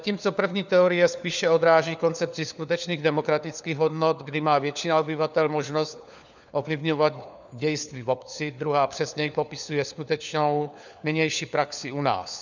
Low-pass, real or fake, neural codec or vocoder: 7.2 kHz; fake; codec, 16 kHz, 4 kbps, FunCodec, trained on LibriTTS, 50 frames a second